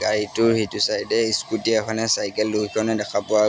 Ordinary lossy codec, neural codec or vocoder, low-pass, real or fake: none; none; none; real